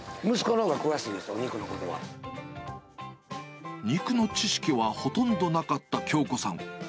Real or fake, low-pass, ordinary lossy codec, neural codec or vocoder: real; none; none; none